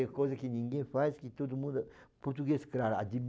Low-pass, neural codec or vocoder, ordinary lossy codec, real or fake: none; none; none; real